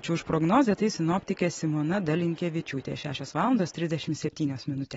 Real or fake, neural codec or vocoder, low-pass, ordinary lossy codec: real; none; 19.8 kHz; AAC, 24 kbps